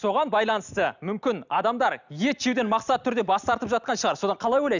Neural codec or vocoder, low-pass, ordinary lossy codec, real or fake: none; 7.2 kHz; none; real